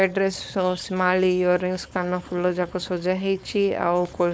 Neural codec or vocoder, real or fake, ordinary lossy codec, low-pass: codec, 16 kHz, 4.8 kbps, FACodec; fake; none; none